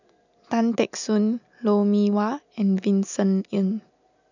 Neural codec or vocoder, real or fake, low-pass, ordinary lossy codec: none; real; 7.2 kHz; none